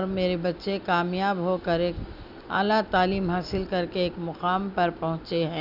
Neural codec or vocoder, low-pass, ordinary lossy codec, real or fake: none; 5.4 kHz; none; real